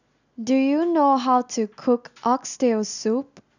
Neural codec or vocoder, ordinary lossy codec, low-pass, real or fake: none; none; 7.2 kHz; real